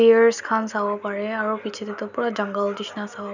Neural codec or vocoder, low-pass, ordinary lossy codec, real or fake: none; 7.2 kHz; none; real